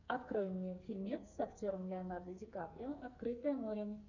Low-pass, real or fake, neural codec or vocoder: 7.2 kHz; fake; codec, 44.1 kHz, 2.6 kbps, SNAC